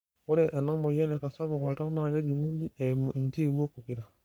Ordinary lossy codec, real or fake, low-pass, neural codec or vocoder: none; fake; none; codec, 44.1 kHz, 3.4 kbps, Pupu-Codec